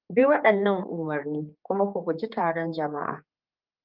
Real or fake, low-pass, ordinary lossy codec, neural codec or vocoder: fake; 5.4 kHz; Opus, 24 kbps; codec, 16 kHz, 4 kbps, X-Codec, HuBERT features, trained on general audio